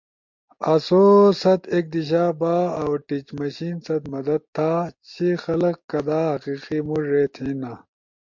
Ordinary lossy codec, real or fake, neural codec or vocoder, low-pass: MP3, 48 kbps; real; none; 7.2 kHz